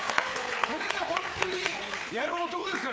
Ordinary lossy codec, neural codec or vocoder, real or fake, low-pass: none; codec, 16 kHz, 4 kbps, FreqCodec, larger model; fake; none